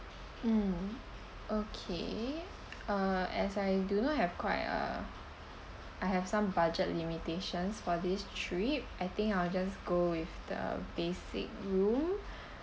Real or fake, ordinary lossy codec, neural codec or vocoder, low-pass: real; none; none; none